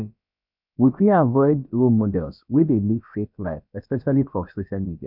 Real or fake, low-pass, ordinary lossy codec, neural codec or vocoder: fake; 5.4 kHz; none; codec, 16 kHz, about 1 kbps, DyCAST, with the encoder's durations